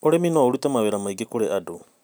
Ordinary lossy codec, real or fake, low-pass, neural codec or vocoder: none; real; none; none